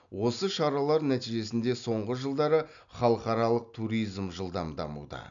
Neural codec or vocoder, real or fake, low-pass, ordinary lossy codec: none; real; 7.2 kHz; none